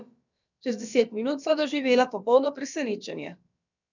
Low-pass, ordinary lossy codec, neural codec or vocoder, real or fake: 7.2 kHz; none; codec, 16 kHz, about 1 kbps, DyCAST, with the encoder's durations; fake